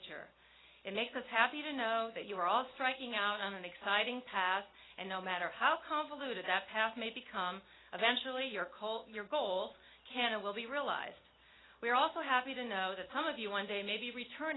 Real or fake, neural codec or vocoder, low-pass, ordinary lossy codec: real; none; 7.2 kHz; AAC, 16 kbps